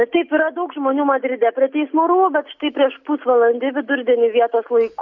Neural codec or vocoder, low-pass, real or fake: none; 7.2 kHz; real